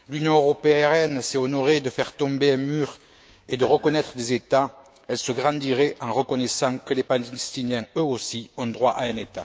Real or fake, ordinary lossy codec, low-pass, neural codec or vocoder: fake; none; none; codec, 16 kHz, 6 kbps, DAC